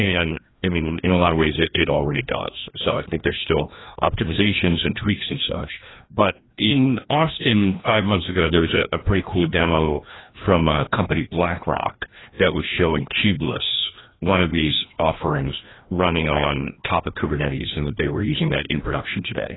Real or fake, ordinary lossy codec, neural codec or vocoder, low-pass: fake; AAC, 16 kbps; codec, 16 kHz, 1 kbps, FreqCodec, larger model; 7.2 kHz